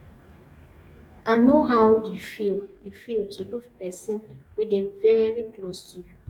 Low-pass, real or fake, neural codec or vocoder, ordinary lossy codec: 19.8 kHz; fake; codec, 44.1 kHz, 2.6 kbps, DAC; none